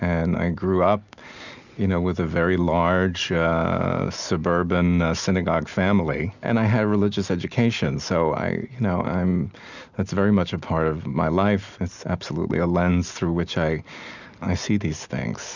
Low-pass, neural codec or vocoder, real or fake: 7.2 kHz; none; real